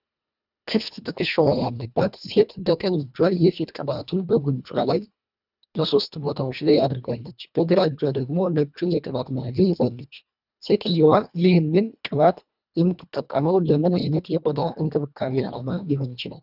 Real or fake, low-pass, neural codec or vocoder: fake; 5.4 kHz; codec, 24 kHz, 1.5 kbps, HILCodec